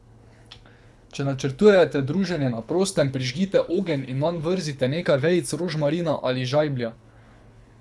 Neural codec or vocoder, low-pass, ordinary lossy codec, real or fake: codec, 24 kHz, 6 kbps, HILCodec; none; none; fake